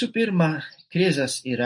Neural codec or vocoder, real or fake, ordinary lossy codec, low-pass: none; real; MP3, 48 kbps; 10.8 kHz